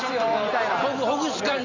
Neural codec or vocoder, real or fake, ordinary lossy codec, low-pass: none; real; none; 7.2 kHz